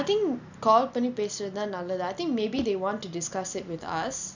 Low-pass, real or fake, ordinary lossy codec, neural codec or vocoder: 7.2 kHz; real; none; none